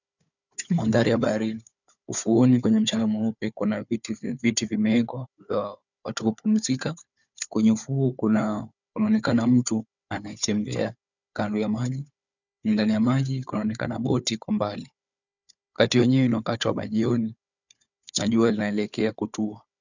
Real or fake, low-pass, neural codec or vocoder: fake; 7.2 kHz; codec, 16 kHz, 4 kbps, FunCodec, trained on Chinese and English, 50 frames a second